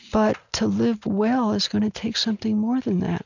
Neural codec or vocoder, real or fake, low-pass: none; real; 7.2 kHz